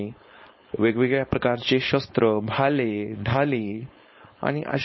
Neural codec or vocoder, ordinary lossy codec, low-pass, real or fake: codec, 16 kHz, 4.8 kbps, FACodec; MP3, 24 kbps; 7.2 kHz; fake